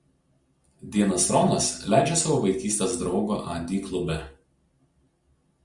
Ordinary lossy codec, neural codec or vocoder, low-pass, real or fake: Opus, 64 kbps; none; 10.8 kHz; real